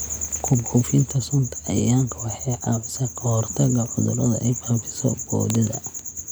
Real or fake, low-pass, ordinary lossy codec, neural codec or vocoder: real; none; none; none